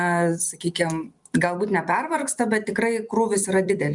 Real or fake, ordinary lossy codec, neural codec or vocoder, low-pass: fake; MP3, 96 kbps; vocoder, 44.1 kHz, 128 mel bands every 256 samples, BigVGAN v2; 10.8 kHz